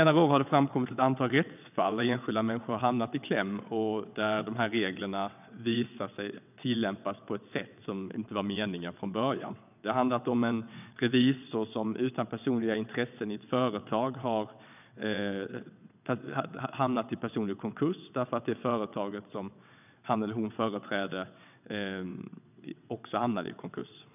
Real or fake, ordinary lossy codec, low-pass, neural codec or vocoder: fake; none; 3.6 kHz; vocoder, 22.05 kHz, 80 mel bands, WaveNeXt